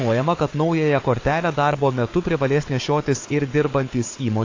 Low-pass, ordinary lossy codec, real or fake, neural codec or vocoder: 7.2 kHz; AAC, 32 kbps; fake; codec, 16 kHz, 4 kbps, FunCodec, trained on Chinese and English, 50 frames a second